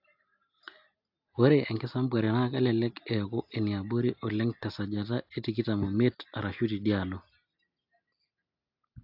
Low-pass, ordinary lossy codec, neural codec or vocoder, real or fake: 5.4 kHz; AAC, 48 kbps; none; real